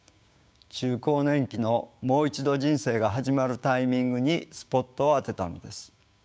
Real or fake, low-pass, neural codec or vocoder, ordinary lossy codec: fake; none; codec, 16 kHz, 6 kbps, DAC; none